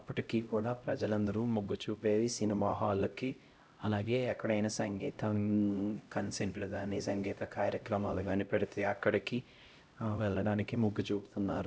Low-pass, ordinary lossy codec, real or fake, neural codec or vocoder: none; none; fake; codec, 16 kHz, 0.5 kbps, X-Codec, HuBERT features, trained on LibriSpeech